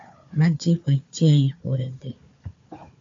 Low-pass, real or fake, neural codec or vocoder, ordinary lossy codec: 7.2 kHz; fake; codec, 16 kHz, 4 kbps, FunCodec, trained on Chinese and English, 50 frames a second; AAC, 48 kbps